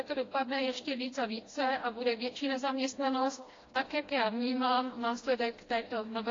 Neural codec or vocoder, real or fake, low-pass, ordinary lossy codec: codec, 16 kHz, 1 kbps, FreqCodec, smaller model; fake; 7.2 kHz; AAC, 32 kbps